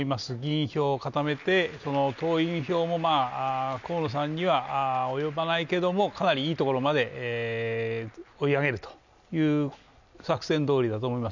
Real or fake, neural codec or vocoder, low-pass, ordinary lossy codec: real; none; 7.2 kHz; none